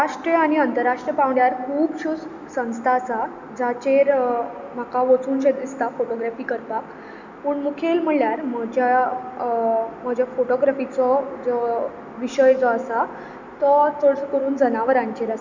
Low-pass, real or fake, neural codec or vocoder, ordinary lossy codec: 7.2 kHz; real; none; none